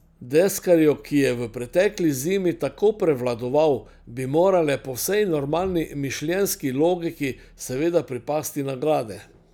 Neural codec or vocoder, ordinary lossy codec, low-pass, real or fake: none; none; none; real